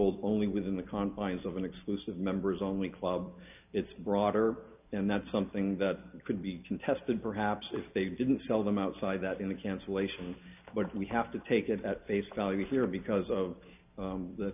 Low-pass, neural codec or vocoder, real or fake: 3.6 kHz; none; real